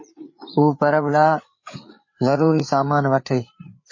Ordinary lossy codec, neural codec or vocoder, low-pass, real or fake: MP3, 32 kbps; codec, 16 kHz, 8 kbps, FreqCodec, larger model; 7.2 kHz; fake